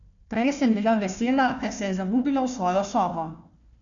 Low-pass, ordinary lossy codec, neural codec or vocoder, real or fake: 7.2 kHz; none; codec, 16 kHz, 1 kbps, FunCodec, trained on Chinese and English, 50 frames a second; fake